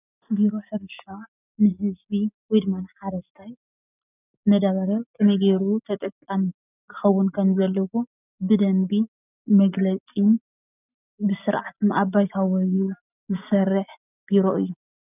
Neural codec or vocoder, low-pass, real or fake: none; 3.6 kHz; real